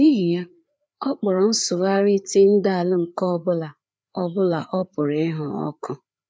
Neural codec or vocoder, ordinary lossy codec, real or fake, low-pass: codec, 16 kHz, 4 kbps, FreqCodec, larger model; none; fake; none